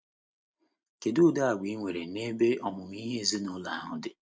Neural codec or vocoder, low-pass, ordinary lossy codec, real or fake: none; none; none; real